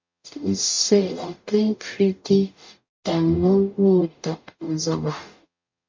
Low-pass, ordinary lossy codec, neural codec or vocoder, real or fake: 7.2 kHz; MP3, 48 kbps; codec, 44.1 kHz, 0.9 kbps, DAC; fake